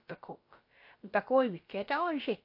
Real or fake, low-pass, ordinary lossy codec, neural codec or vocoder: fake; 5.4 kHz; MP3, 32 kbps; codec, 16 kHz, about 1 kbps, DyCAST, with the encoder's durations